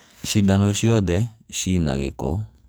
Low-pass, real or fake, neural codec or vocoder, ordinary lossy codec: none; fake; codec, 44.1 kHz, 2.6 kbps, SNAC; none